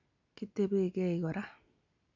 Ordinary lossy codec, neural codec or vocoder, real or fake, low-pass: none; none; real; 7.2 kHz